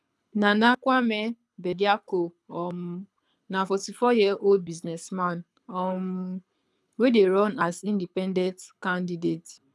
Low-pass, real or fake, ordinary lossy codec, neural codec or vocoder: none; fake; none; codec, 24 kHz, 6 kbps, HILCodec